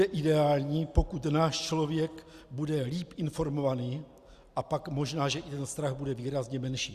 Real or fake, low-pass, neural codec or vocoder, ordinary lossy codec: real; 14.4 kHz; none; Opus, 64 kbps